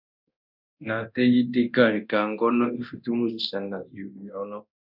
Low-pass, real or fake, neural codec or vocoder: 5.4 kHz; fake; codec, 24 kHz, 0.9 kbps, DualCodec